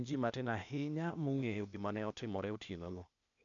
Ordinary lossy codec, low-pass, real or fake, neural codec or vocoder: none; 7.2 kHz; fake; codec, 16 kHz, 0.8 kbps, ZipCodec